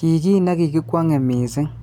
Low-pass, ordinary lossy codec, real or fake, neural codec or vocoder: 19.8 kHz; none; real; none